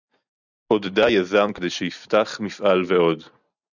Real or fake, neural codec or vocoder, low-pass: real; none; 7.2 kHz